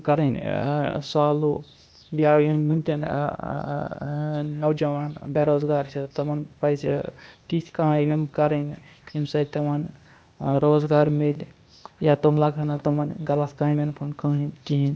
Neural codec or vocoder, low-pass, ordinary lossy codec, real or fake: codec, 16 kHz, 0.8 kbps, ZipCodec; none; none; fake